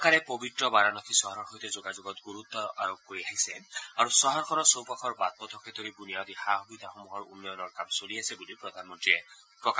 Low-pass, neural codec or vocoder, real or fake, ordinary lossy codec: none; none; real; none